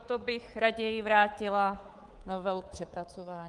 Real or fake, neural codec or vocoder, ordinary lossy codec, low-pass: fake; codec, 24 kHz, 3.1 kbps, DualCodec; Opus, 24 kbps; 10.8 kHz